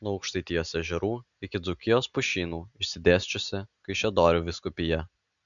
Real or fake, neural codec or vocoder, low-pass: real; none; 7.2 kHz